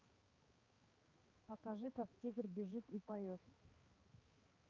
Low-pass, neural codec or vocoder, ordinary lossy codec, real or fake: 7.2 kHz; codec, 16 kHz, 4 kbps, X-Codec, HuBERT features, trained on balanced general audio; Opus, 32 kbps; fake